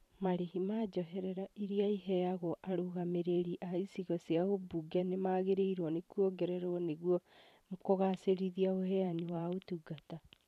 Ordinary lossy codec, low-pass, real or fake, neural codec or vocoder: none; 14.4 kHz; fake; vocoder, 44.1 kHz, 128 mel bands every 512 samples, BigVGAN v2